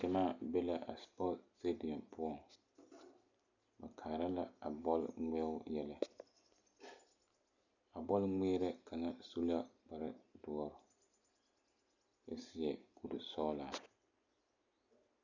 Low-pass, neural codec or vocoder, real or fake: 7.2 kHz; none; real